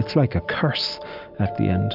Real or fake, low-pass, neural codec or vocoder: real; 5.4 kHz; none